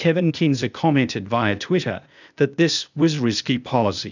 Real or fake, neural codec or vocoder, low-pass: fake; codec, 16 kHz, 0.8 kbps, ZipCodec; 7.2 kHz